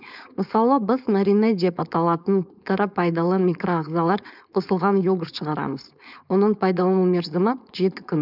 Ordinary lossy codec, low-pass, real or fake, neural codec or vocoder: none; 5.4 kHz; fake; codec, 16 kHz, 4.8 kbps, FACodec